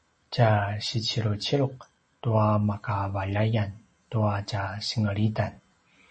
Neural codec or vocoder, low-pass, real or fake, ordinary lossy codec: none; 10.8 kHz; real; MP3, 32 kbps